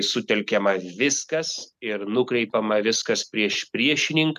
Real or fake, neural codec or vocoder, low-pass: real; none; 14.4 kHz